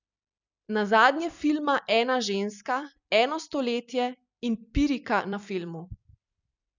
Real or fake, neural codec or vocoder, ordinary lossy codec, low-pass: fake; vocoder, 44.1 kHz, 128 mel bands every 256 samples, BigVGAN v2; none; 7.2 kHz